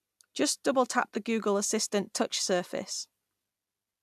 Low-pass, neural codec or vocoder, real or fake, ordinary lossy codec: 14.4 kHz; none; real; AAC, 96 kbps